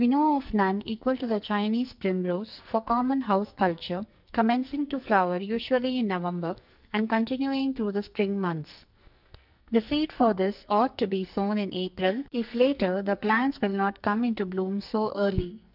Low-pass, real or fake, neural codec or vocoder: 5.4 kHz; fake; codec, 44.1 kHz, 2.6 kbps, SNAC